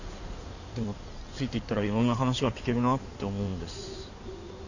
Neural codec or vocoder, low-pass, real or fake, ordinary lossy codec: codec, 16 kHz in and 24 kHz out, 2.2 kbps, FireRedTTS-2 codec; 7.2 kHz; fake; none